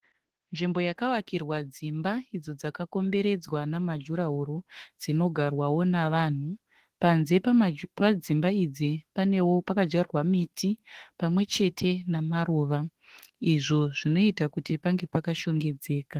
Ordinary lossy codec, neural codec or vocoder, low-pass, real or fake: Opus, 16 kbps; autoencoder, 48 kHz, 32 numbers a frame, DAC-VAE, trained on Japanese speech; 14.4 kHz; fake